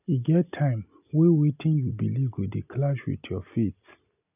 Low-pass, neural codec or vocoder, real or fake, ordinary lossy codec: 3.6 kHz; none; real; none